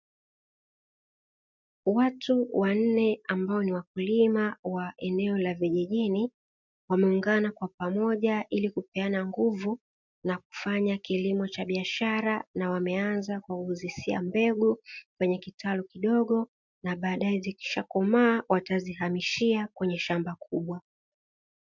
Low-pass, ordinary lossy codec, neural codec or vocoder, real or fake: 7.2 kHz; MP3, 64 kbps; none; real